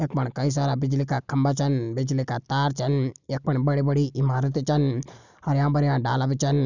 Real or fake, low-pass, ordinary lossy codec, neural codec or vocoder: real; 7.2 kHz; none; none